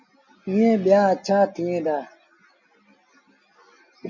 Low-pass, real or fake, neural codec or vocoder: 7.2 kHz; real; none